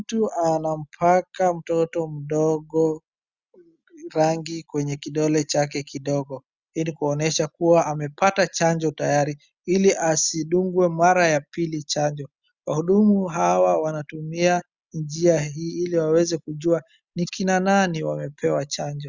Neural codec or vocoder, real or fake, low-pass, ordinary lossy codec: none; real; 7.2 kHz; Opus, 64 kbps